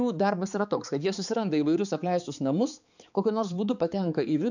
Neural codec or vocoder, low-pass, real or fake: codec, 16 kHz, 4 kbps, X-Codec, HuBERT features, trained on balanced general audio; 7.2 kHz; fake